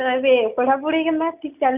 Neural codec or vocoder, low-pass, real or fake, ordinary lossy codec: none; 3.6 kHz; real; none